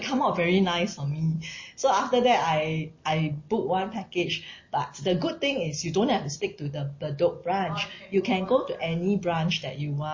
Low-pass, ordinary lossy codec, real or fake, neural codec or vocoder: 7.2 kHz; MP3, 32 kbps; real; none